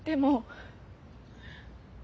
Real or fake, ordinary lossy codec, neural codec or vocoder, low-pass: real; none; none; none